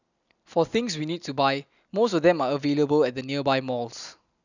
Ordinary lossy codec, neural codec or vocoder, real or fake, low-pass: none; none; real; 7.2 kHz